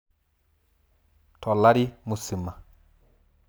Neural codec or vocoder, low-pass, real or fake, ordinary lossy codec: none; none; real; none